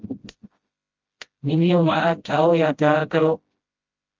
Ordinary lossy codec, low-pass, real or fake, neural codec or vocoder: Opus, 32 kbps; 7.2 kHz; fake; codec, 16 kHz, 0.5 kbps, FreqCodec, smaller model